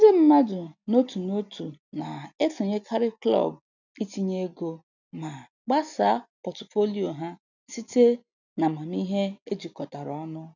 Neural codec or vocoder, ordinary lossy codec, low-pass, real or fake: none; none; 7.2 kHz; real